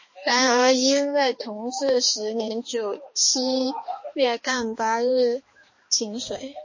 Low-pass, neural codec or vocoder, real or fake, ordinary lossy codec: 7.2 kHz; codec, 16 kHz, 2 kbps, X-Codec, HuBERT features, trained on balanced general audio; fake; MP3, 32 kbps